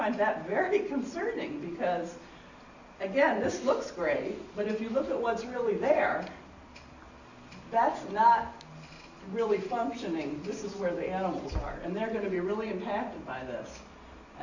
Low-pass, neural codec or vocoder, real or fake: 7.2 kHz; none; real